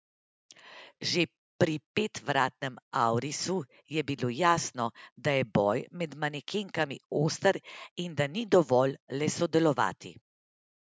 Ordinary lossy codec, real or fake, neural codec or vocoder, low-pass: none; real; none; none